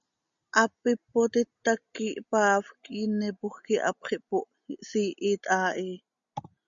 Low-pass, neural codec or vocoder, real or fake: 7.2 kHz; none; real